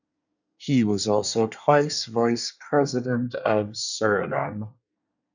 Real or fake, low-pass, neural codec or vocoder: fake; 7.2 kHz; codec, 24 kHz, 1 kbps, SNAC